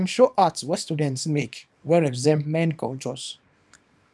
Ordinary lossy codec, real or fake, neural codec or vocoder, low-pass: none; fake; codec, 24 kHz, 0.9 kbps, WavTokenizer, small release; none